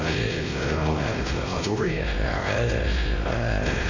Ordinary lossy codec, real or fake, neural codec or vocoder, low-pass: AAC, 48 kbps; fake; codec, 16 kHz, 1 kbps, X-Codec, WavLM features, trained on Multilingual LibriSpeech; 7.2 kHz